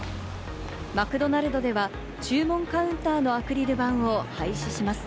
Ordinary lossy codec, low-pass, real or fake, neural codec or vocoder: none; none; real; none